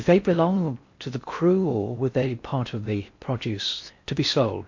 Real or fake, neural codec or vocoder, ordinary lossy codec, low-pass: fake; codec, 16 kHz in and 24 kHz out, 0.6 kbps, FocalCodec, streaming, 4096 codes; MP3, 48 kbps; 7.2 kHz